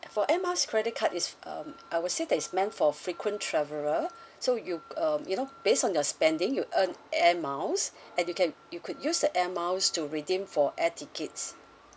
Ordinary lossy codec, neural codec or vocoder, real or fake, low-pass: none; none; real; none